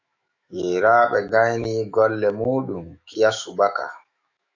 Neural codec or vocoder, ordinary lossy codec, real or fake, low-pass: autoencoder, 48 kHz, 128 numbers a frame, DAC-VAE, trained on Japanese speech; AAC, 48 kbps; fake; 7.2 kHz